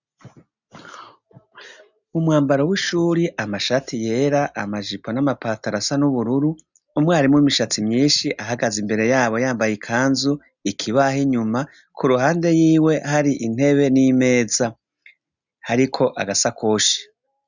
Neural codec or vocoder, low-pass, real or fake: none; 7.2 kHz; real